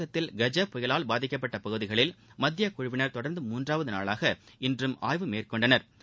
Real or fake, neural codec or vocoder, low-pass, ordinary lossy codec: real; none; none; none